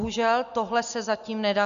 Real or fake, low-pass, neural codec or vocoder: real; 7.2 kHz; none